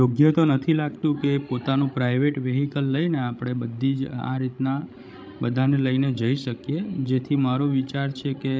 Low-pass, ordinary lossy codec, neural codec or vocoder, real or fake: none; none; codec, 16 kHz, 16 kbps, FreqCodec, larger model; fake